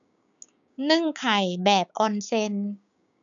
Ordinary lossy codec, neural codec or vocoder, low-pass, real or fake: none; codec, 16 kHz, 6 kbps, DAC; 7.2 kHz; fake